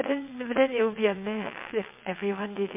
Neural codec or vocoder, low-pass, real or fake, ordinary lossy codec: vocoder, 22.05 kHz, 80 mel bands, WaveNeXt; 3.6 kHz; fake; MP3, 32 kbps